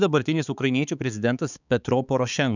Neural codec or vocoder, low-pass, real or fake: codec, 16 kHz, 4 kbps, X-Codec, HuBERT features, trained on balanced general audio; 7.2 kHz; fake